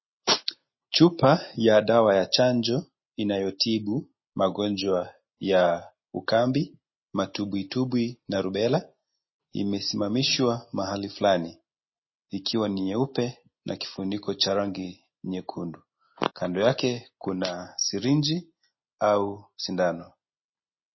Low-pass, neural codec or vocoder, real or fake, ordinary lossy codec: 7.2 kHz; none; real; MP3, 24 kbps